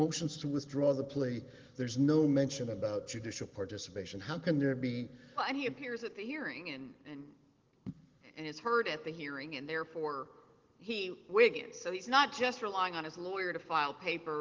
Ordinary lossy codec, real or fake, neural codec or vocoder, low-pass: Opus, 16 kbps; real; none; 7.2 kHz